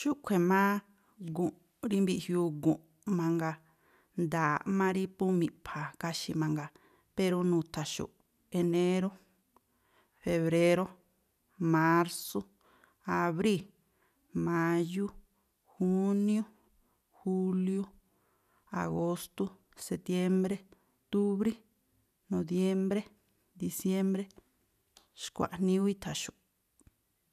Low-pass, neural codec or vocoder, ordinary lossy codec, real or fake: 14.4 kHz; none; none; real